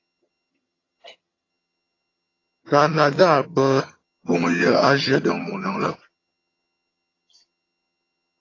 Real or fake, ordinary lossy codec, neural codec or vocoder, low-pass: fake; AAC, 32 kbps; vocoder, 22.05 kHz, 80 mel bands, HiFi-GAN; 7.2 kHz